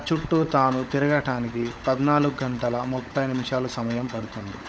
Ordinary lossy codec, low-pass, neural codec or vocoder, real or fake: none; none; codec, 16 kHz, 16 kbps, FunCodec, trained on LibriTTS, 50 frames a second; fake